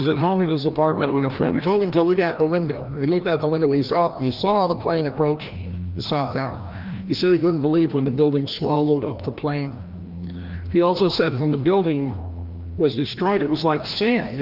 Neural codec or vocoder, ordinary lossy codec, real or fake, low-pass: codec, 16 kHz, 1 kbps, FreqCodec, larger model; Opus, 32 kbps; fake; 5.4 kHz